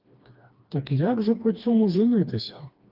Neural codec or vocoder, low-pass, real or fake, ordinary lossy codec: codec, 16 kHz, 2 kbps, FreqCodec, smaller model; 5.4 kHz; fake; Opus, 32 kbps